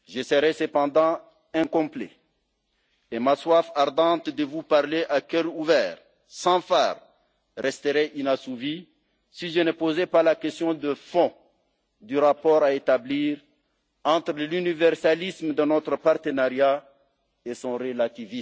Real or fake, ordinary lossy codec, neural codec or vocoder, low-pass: real; none; none; none